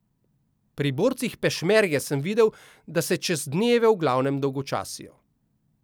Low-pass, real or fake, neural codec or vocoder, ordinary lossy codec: none; real; none; none